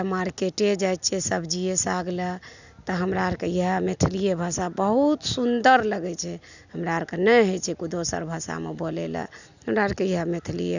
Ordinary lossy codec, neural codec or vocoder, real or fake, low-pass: none; none; real; 7.2 kHz